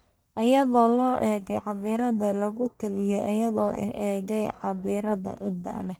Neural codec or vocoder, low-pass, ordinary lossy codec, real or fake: codec, 44.1 kHz, 1.7 kbps, Pupu-Codec; none; none; fake